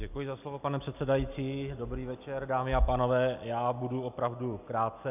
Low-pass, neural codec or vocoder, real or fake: 3.6 kHz; none; real